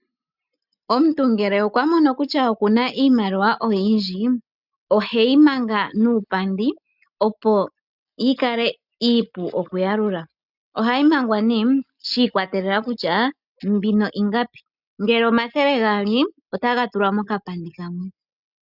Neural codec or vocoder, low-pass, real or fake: none; 5.4 kHz; real